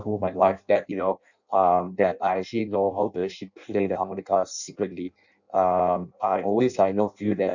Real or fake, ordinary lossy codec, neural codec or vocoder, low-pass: fake; none; codec, 16 kHz in and 24 kHz out, 0.6 kbps, FireRedTTS-2 codec; 7.2 kHz